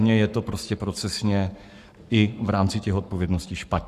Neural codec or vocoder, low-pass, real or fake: codec, 44.1 kHz, 7.8 kbps, Pupu-Codec; 14.4 kHz; fake